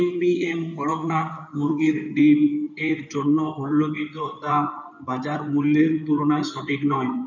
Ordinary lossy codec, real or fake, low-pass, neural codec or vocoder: none; fake; 7.2 kHz; codec, 16 kHz in and 24 kHz out, 2.2 kbps, FireRedTTS-2 codec